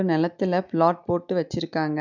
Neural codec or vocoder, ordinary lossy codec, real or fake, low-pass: none; none; real; 7.2 kHz